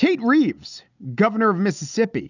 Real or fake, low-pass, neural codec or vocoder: real; 7.2 kHz; none